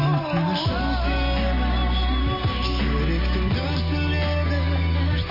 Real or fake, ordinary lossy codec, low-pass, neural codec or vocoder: real; MP3, 24 kbps; 5.4 kHz; none